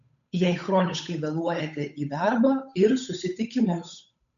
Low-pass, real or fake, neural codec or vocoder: 7.2 kHz; fake; codec, 16 kHz, 8 kbps, FunCodec, trained on Chinese and English, 25 frames a second